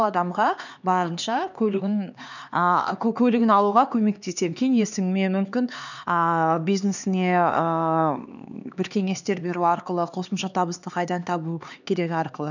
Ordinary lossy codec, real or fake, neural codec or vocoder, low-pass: none; fake; codec, 16 kHz, 2 kbps, X-Codec, HuBERT features, trained on LibriSpeech; 7.2 kHz